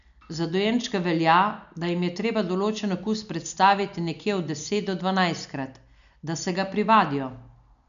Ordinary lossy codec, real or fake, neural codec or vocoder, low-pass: none; real; none; 7.2 kHz